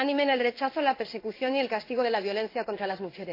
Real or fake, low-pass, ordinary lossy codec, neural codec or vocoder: fake; 5.4 kHz; AAC, 32 kbps; codec, 16 kHz in and 24 kHz out, 1 kbps, XY-Tokenizer